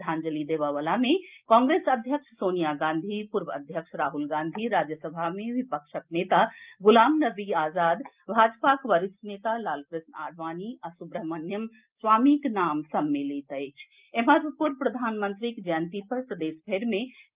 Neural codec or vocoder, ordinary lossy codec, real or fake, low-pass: none; Opus, 24 kbps; real; 3.6 kHz